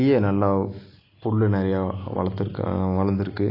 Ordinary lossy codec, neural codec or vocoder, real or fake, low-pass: AAC, 32 kbps; none; real; 5.4 kHz